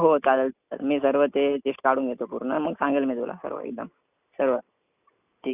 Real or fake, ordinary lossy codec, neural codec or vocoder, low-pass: real; none; none; 3.6 kHz